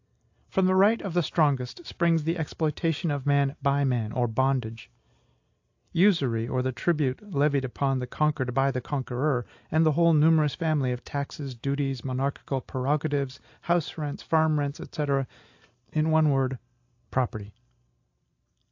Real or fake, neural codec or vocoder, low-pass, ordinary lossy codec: real; none; 7.2 kHz; AAC, 48 kbps